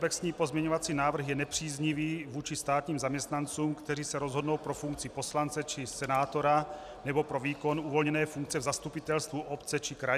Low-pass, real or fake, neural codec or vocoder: 14.4 kHz; real; none